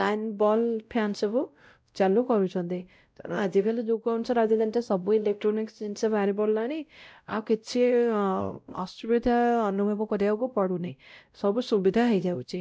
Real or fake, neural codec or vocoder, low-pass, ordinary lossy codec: fake; codec, 16 kHz, 0.5 kbps, X-Codec, WavLM features, trained on Multilingual LibriSpeech; none; none